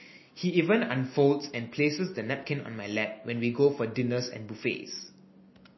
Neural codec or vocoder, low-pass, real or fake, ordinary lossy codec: none; 7.2 kHz; real; MP3, 24 kbps